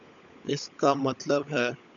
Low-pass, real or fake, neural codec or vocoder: 7.2 kHz; fake; codec, 16 kHz, 16 kbps, FunCodec, trained on LibriTTS, 50 frames a second